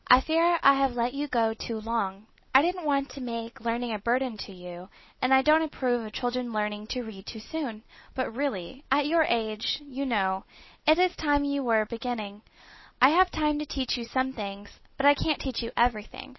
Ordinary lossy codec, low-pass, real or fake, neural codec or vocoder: MP3, 24 kbps; 7.2 kHz; real; none